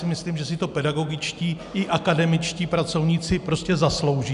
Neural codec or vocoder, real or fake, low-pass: none; real; 10.8 kHz